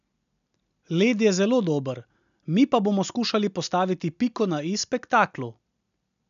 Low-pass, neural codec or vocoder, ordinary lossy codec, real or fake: 7.2 kHz; none; none; real